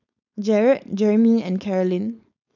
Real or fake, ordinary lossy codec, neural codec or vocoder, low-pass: fake; none; codec, 16 kHz, 4.8 kbps, FACodec; 7.2 kHz